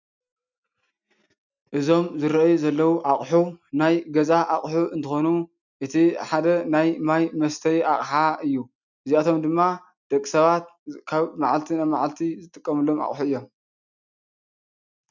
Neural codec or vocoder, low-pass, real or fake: none; 7.2 kHz; real